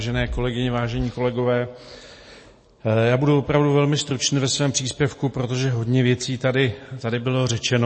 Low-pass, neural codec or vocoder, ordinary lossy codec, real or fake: 9.9 kHz; none; MP3, 32 kbps; real